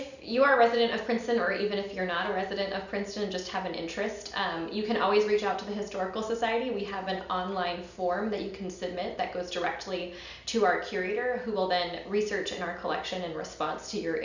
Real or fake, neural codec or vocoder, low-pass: real; none; 7.2 kHz